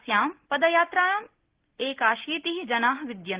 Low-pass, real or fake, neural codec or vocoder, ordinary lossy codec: 3.6 kHz; real; none; Opus, 16 kbps